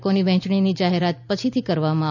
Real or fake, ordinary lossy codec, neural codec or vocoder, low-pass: real; none; none; 7.2 kHz